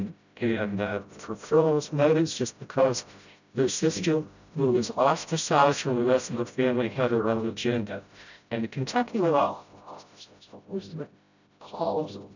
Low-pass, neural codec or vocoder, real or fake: 7.2 kHz; codec, 16 kHz, 0.5 kbps, FreqCodec, smaller model; fake